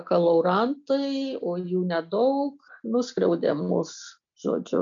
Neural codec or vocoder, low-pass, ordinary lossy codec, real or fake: none; 7.2 kHz; MP3, 64 kbps; real